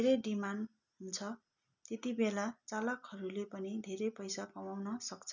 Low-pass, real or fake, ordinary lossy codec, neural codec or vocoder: 7.2 kHz; real; none; none